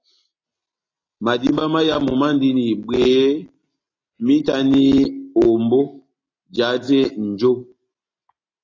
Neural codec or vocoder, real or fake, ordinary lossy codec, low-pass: none; real; AAC, 32 kbps; 7.2 kHz